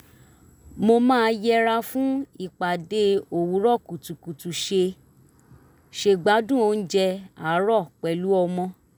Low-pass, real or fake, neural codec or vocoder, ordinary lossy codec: none; real; none; none